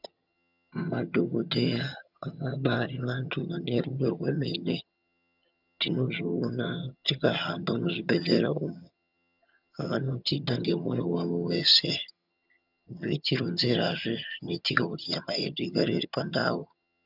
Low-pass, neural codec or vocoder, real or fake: 5.4 kHz; vocoder, 22.05 kHz, 80 mel bands, HiFi-GAN; fake